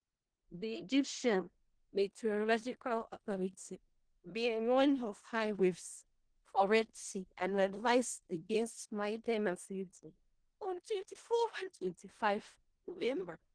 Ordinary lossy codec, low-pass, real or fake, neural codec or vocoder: Opus, 16 kbps; 9.9 kHz; fake; codec, 16 kHz in and 24 kHz out, 0.4 kbps, LongCat-Audio-Codec, four codebook decoder